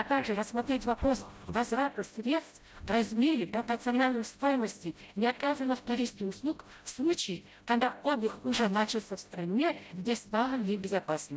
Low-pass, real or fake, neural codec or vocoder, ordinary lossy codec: none; fake; codec, 16 kHz, 0.5 kbps, FreqCodec, smaller model; none